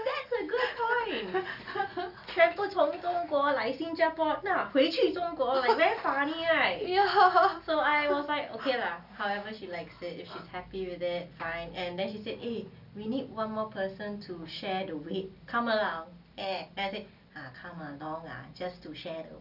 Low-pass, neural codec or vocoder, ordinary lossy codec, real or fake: 5.4 kHz; none; none; real